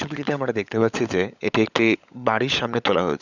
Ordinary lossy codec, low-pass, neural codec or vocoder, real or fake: none; 7.2 kHz; codec, 16 kHz, 16 kbps, FunCodec, trained on LibriTTS, 50 frames a second; fake